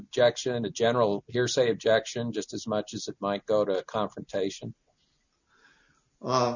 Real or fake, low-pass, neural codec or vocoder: real; 7.2 kHz; none